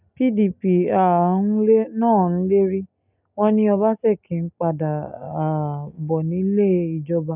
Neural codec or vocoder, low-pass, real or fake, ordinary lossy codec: none; 3.6 kHz; real; none